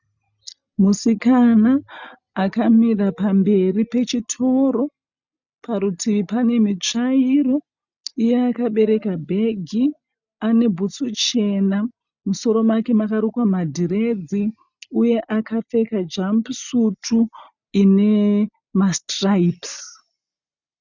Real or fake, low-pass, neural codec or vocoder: real; 7.2 kHz; none